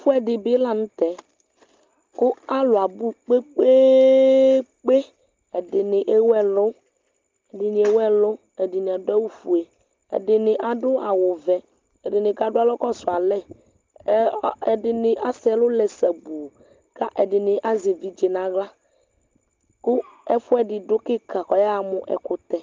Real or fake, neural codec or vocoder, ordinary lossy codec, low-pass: real; none; Opus, 32 kbps; 7.2 kHz